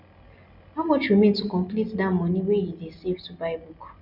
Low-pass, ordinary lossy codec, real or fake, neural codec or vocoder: 5.4 kHz; none; real; none